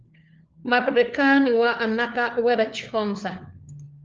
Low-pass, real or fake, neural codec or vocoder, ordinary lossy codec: 7.2 kHz; fake; codec, 16 kHz, 4 kbps, FunCodec, trained on LibriTTS, 50 frames a second; Opus, 24 kbps